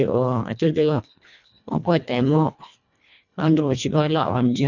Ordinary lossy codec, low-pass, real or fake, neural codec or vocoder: none; 7.2 kHz; fake; codec, 24 kHz, 1.5 kbps, HILCodec